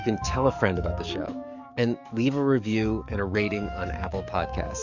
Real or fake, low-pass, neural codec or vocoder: fake; 7.2 kHz; codec, 44.1 kHz, 7.8 kbps, DAC